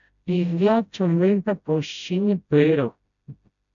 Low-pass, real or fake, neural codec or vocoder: 7.2 kHz; fake; codec, 16 kHz, 0.5 kbps, FreqCodec, smaller model